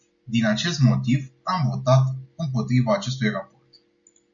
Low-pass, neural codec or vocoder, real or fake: 7.2 kHz; none; real